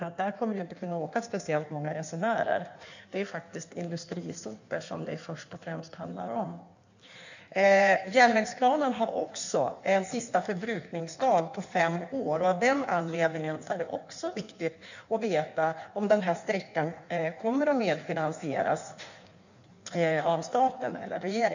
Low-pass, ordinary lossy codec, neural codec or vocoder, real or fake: 7.2 kHz; none; codec, 16 kHz in and 24 kHz out, 1.1 kbps, FireRedTTS-2 codec; fake